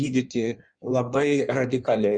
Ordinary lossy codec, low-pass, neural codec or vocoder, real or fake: Opus, 64 kbps; 9.9 kHz; codec, 16 kHz in and 24 kHz out, 1.1 kbps, FireRedTTS-2 codec; fake